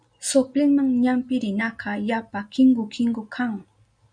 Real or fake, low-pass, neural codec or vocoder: real; 9.9 kHz; none